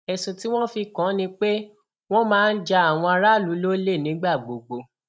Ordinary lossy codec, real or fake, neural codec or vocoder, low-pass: none; real; none; none